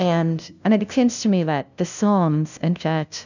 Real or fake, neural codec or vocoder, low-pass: fake; codec, 16 kHz, 0.5 kbps, FunCodec, trained on LibriTTS, 25 frames a second; 7.2 kHz